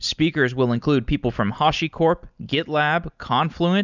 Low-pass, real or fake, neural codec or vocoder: 7.2 kHz; real; none